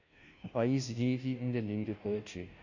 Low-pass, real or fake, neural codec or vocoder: 7.2 kHz; fake; codec, 16 kHz, 0.5 kbps, FunCodec, trained on Chinese and English, 25 frames a second